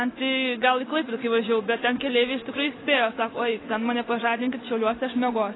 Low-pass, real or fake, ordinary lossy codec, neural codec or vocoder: 7.2 kHz; real; AAC, 16 kbps; none